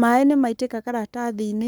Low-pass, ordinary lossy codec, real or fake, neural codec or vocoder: none; none; fake; codec, 44.1 kHz, 7.8 kbps, Pupu-Codec